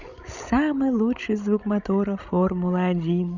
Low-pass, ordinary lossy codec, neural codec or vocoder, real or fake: 7.2 kHz; none; codec, 16 kHz, 16 kbps, FreqCodec, larger model; fake